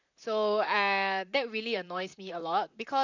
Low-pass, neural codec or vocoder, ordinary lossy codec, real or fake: 7.2 kHz; vocoder, 44.1 kHz, 128 mel bands, Pupu-Vocoder; none; fake